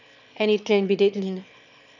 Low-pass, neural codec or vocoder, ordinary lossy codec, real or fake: 7.2 kHz; autoencoder, 22.05 kHz, a latent of 192 numbers a frame, VITS, trained on one speaker; none; fake